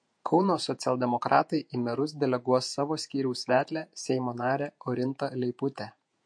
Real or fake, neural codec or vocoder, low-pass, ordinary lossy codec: fake; vocoder, 48 kHz, 128 mel bands, Vocos; 9.9 kHz; MP3, 48 kbps